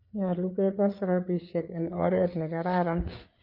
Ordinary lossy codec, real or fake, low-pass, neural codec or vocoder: none; fake; 5.4 kHz; codec, 16 kHz, 4 kbps, FreqCodec, larger model